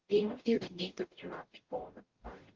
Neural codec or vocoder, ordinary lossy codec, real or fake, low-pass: codec, 44.1 kHz, 0.9 kbps, DAC; Opus, 16 kbps; fake; 7.2 kHz